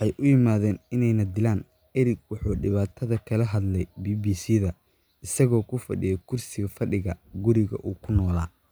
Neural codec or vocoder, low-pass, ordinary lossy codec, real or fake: none; none; none; real